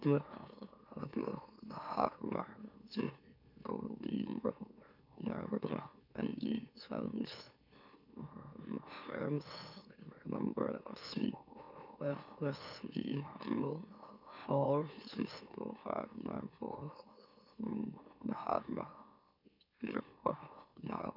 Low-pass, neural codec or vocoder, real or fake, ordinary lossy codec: 5.4 kHz; autoencoder, 44.1 kHz, a latent of 192 numbers a frame, MeloTTS; fake; none